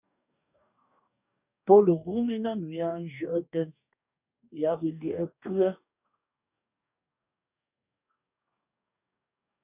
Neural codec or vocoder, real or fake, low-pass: codec, 44.1 kHz, 2.6 kbps, DAC; fake; 3.6 kHz